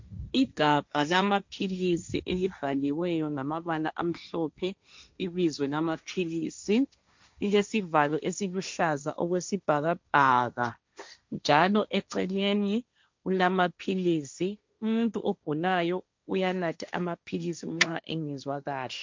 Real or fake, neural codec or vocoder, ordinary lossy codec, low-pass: fake; codec, 16 kHz, 1.1 kbps, Voila-Tokenizer; MP3, 64 kbps; 7.2 kHz